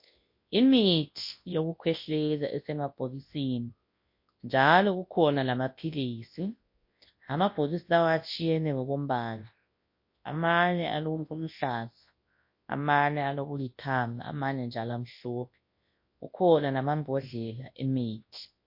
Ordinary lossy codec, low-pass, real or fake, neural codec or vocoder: MP3, 32 kbps; 5.4 kHz; fake; codec, 24 kHz, 0.9 kbps, WavTokenizer, large speech release